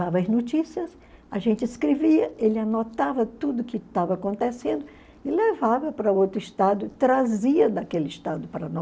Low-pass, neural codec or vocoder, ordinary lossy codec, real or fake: none; none; none; real